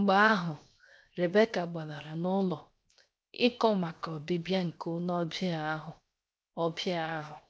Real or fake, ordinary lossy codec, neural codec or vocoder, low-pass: fake; none; codec, 16 kHz, 0.7 kbps, FocalCodec; none